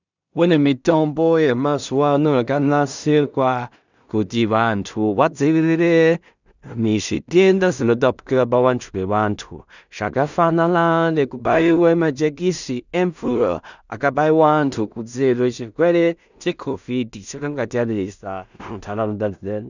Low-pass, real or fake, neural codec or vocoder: 7.2 kHz; fake; codec, 16 kHz in and 24 kHz out, 0.4 kbps, LongCat-Audio-Codec, two codebook decoder